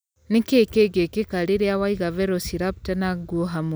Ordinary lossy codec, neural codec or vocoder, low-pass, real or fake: none; none; none; real